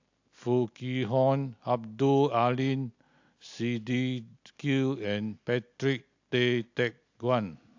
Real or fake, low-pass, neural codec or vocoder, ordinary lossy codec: real; 7.2 kHz; none; AAC, 48 kbps